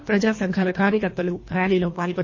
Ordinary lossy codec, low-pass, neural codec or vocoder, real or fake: MP3, 32 kbps; 7.2 kHz; codec, 24 kHz, 1.5 kbps, HILCodec; fake